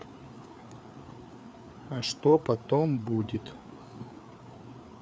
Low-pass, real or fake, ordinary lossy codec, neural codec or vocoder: none; fake; none; codec, 16 kHz, 4 kbps, FreqCodec, larger model